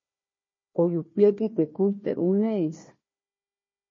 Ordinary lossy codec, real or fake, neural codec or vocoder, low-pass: MP3, 32 kbps; fake; codec, 16 kHz, 1 kbps, FunCodec, trained on Chinese and English, 50 frames a second; 7.2 kHz